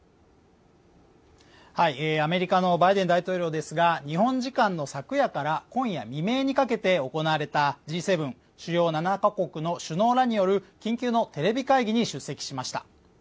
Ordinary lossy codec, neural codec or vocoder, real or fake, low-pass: none; none; real; none